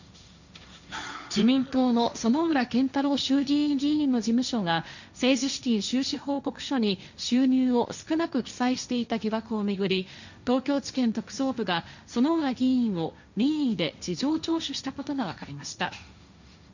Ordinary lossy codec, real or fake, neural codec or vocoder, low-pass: none; fake; codec, 16 kHz, 1.1 kbps, Voila-Tokenizer; 7.2 kHz